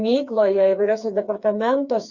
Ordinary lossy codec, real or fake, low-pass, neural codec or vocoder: Opus, 64 kbps; fake; 7.2 kHz; codec, 16 kHz, 4 kbps, FreqCodec, smaller model